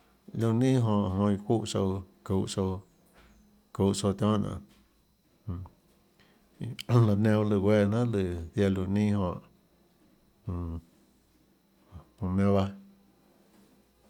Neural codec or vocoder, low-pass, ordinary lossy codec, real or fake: none; 19.8 kHz; none; real